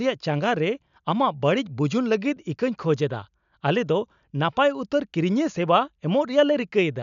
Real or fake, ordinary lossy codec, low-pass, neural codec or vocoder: real; none; 7.2 kHz; none